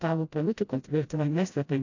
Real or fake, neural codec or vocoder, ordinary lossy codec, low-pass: fake; codec, 16 kHz, 0.5 kbps, FreqCodec, smaller model; AAC, 48 kbps; 7.2 kHz